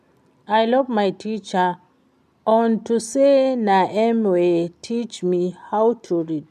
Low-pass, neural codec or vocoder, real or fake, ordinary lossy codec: 14.4 kHz; none; real; none